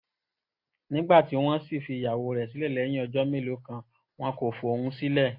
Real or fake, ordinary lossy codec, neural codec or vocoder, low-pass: real; AAC, 32 kbps; none; 5.4 kHz